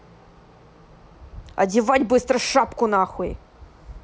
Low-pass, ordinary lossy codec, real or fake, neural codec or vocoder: none; none; real; none